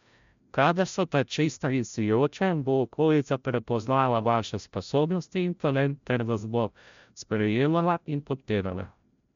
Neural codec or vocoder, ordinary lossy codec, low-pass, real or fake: codec, 16 kHz, 0.5 kbps, FreqCodec, larger model; MP3, 64 kbps; 7.2 kHz; fake